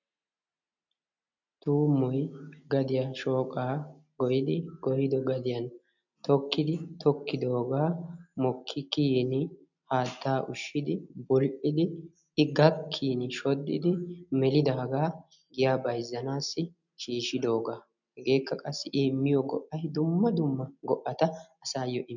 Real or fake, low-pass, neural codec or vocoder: real; 7.2 kHz; none